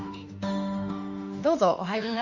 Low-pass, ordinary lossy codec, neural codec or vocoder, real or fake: 7.2 kHz; Opus, 64 kbps; autoencoder, 48 kHz, 32 numbers a frame, DAC-VAE, trained on Japanese speech; fake